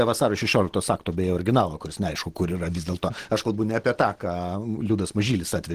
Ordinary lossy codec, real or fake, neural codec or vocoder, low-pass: Opus, 16 kbps; real; none; 14.4 kHz